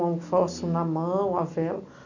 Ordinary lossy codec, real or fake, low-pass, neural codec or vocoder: none; real; 7.2 kHz; none